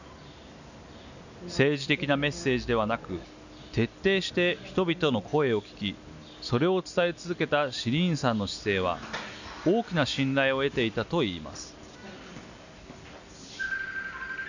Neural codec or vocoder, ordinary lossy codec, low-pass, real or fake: none; none; 7.2 kHz; real